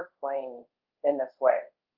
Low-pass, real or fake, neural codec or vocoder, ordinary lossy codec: 5.4 kHz; fake; codec, 24 kHz, 0.5 kbps, DualCodec; Opus, 24 kbps